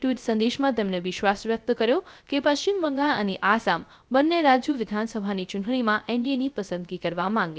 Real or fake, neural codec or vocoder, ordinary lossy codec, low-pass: fake; codec, 16 kHz, 0.3 kbps, FocalCodec; none; none